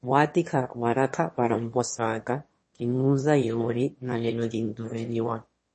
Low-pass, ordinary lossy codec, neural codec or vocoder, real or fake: 9.9 kHz; MP3, 32 kbps; autoencoder, 22.05 kHz, a latent of 192 numbers a frame, VITS, trained on one speaker; fake